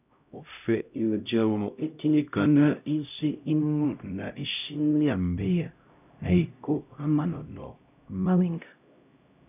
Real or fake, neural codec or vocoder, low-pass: fake; codec, 16 kHz, 0.5 kbps, X-Codec, HuBERT features, trained on LibriSpeech; 3.6 kHz